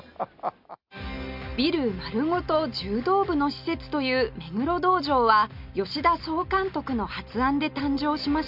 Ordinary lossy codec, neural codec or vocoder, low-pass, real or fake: MP3, 48 kbps; none; 5.4 kHz; real